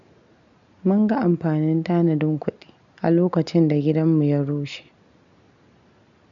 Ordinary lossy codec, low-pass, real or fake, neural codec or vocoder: none; 7.2 kHz; real; none